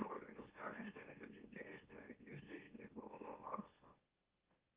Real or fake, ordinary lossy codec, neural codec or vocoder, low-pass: fake; Opus, 32 kbps; autoencoder, 44.1 kHz, a latent of 192 numbers a frame, MeloTTS; 3.6 kHz